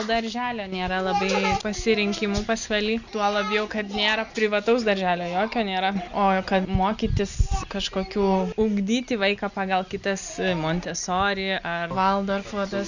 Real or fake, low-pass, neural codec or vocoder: real; 7.2 kHz; none